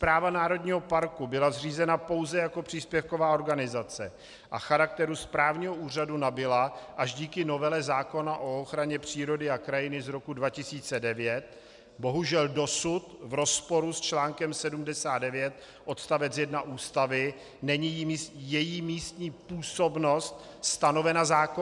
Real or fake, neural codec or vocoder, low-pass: real; none; 10.8 kHz